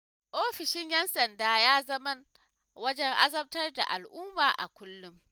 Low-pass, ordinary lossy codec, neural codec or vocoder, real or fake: none; none; none; real